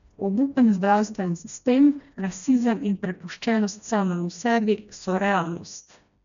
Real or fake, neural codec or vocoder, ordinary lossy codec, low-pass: fake; codec, 16 kHz, 1 kbps, FreqCodec, smaller model; none; 7.2 kHz